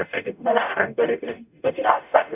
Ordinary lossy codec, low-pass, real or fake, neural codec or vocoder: none; 3.6 kHz; fake; codec, 44.1 kHz, 0.9 kbps, DAC